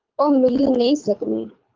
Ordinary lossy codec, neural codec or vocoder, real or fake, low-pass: Opus, 24 kbps; codec, 24 kHz, 3 kbps, HILCodec; fake; 7.2 kHz